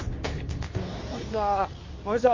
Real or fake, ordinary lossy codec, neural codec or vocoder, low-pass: fake; MP3, 32 kbps; codec, 24 kHz, 0.9 kbps, WavTokenizer, medium speech release version 2; 7.2 kHz